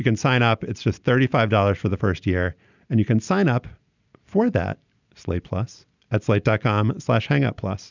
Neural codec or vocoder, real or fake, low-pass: none; real; 7.2 kHz